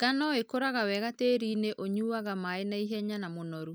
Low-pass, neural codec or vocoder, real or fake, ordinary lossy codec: none; none; real; none